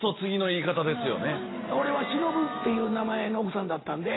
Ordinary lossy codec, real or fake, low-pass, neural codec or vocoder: AAC, 16 kbps; real; 7.2 kHz; none